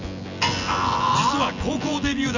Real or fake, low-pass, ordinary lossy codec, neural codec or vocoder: fake; 7.2 kHz; none; vocoder, 24 kHz, 100 mel bands, Vocos